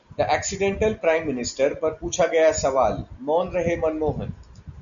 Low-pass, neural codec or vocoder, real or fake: 7.2 kHz; none; real